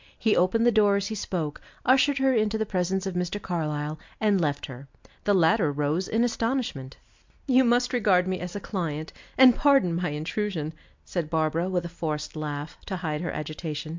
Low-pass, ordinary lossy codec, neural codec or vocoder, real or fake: 7.2 kHz; MP3, 64 kbps; none; real